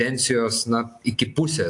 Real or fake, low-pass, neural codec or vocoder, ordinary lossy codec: real; 10.8 kHz; none; AAC, 64 kbps